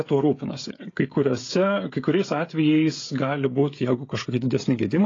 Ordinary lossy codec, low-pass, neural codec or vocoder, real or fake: AAC, 32 kbps; 7.2 kHz; codec, 16 kHz, 16 kbps, FreqCodec, smaller model; fake